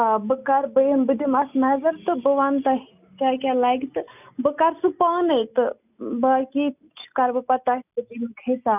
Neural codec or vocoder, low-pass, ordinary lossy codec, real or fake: none; 3.6 kHz; none; real